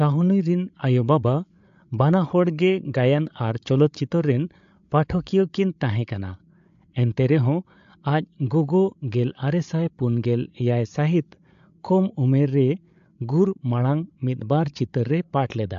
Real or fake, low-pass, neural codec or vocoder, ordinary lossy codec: fake; 7.2 kHz; codec, 16 kHz, 8 kbps, FreqCodec, larger model; AAC, 64 kbps